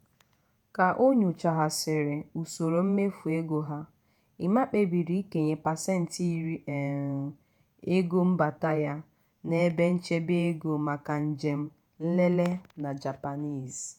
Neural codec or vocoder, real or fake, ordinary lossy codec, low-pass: vocoder, 48 kHz, 128 mel bands, Vocos; fake; none; 19.8 kHz